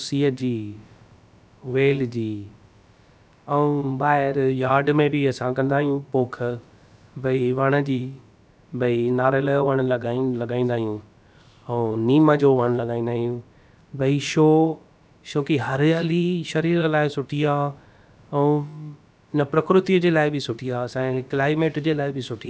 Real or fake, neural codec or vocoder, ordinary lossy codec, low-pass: fake; codec, 16 kHz, about 1 kbps, DyCAST, with the encoder's durations; none; none